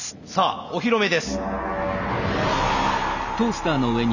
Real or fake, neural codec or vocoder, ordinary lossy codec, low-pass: real; none; none; 7.2 kHz